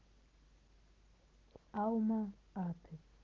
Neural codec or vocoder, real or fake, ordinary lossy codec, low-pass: none; real; Opus, 16 kbps; 7.2 kHz